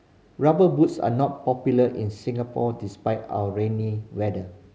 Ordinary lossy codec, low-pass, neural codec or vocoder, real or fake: none; none; none; real